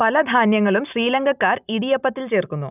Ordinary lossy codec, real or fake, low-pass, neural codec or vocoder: none; real; 3.6 kHz; none